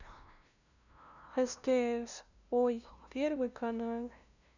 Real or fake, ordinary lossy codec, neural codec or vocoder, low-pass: fake; none; codec, 16 kHz, 0.5 kbps, FunCodec, trained on LibriTTS, 25 frames a second; 7.2 kHz